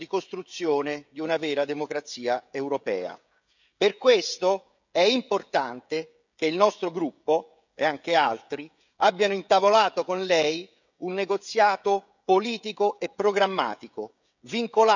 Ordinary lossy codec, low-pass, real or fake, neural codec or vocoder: none; 7.2 kHz; fake; codec, 16 kHz, 16 kbps, FreqCodec, smaller model